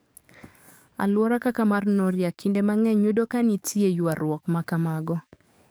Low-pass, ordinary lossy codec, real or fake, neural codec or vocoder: none; none; fake; codec, 44.1 kHz, 7.8 kbps, DAC